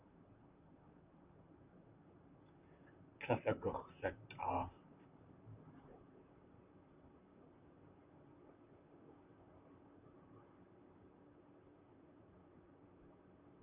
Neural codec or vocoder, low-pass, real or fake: vocoder, 44.1 kHz, 128 mel bands, Pupu-Vocoder; 3.6 kHz; fake